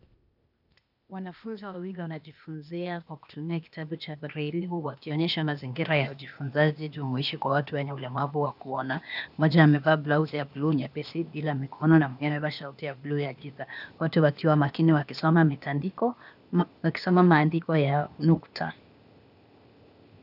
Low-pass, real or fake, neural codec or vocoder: 5.4 kHz; fake; codec, 16 kHz, 0.8 kbps, ZipCodec